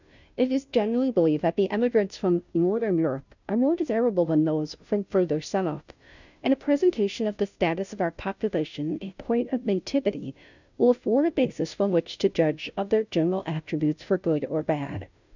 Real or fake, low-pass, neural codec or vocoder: fake; 7.2 kHz; codec, 16 kHz, 0.5 kbps, FunCodec, trained on Chinese and English, 25 frames a second